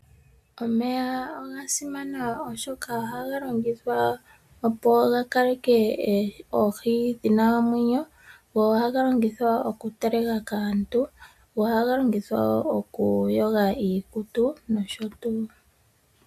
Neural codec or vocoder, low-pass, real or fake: none; 14.4 kHz; real